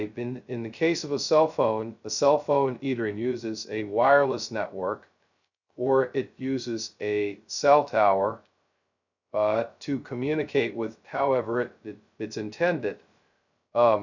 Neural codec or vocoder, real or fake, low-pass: codec, 16 kHz, 0.2 kbps, FocalCodec; fake; 7.2 kHz